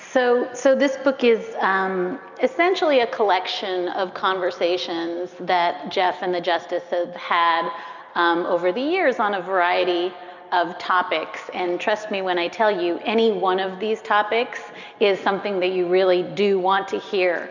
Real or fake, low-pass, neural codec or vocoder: real; 7.2 kHz; none